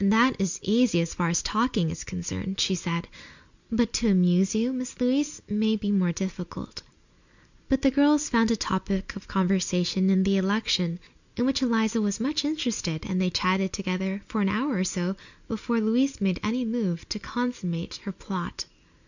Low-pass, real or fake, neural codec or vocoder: 7.2 kHz; real; none